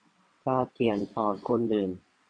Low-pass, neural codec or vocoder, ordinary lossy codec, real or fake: 9.9 kHz; vocoder, 22.05 kHz, 80 mel bands, WaveNeXt; AAC, 48 kbps; fake